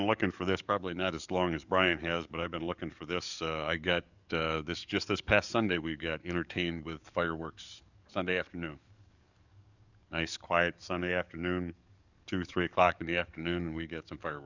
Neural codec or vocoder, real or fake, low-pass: codec, 44.1 kHz, 7.8 kbps, DAC; fake; 7.2 kHz